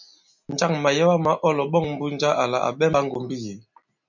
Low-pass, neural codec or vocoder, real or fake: 7.2 kHz; none; real